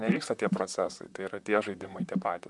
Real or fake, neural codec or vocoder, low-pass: fake; vocoder, 44.1 kHz, 128 mel bands, Pupu-Vocoder; 10.8 kHz